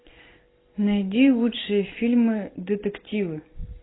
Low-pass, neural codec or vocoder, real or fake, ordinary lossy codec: 7.2 kHz; none; real; AAC, 16 kbps